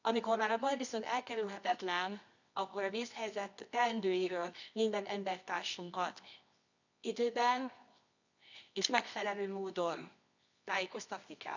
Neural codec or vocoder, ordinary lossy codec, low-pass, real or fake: codec, 24 kHz, 0.9 kbps, WavTokenizer, medium music audio release; none; 7.2 kHz; fake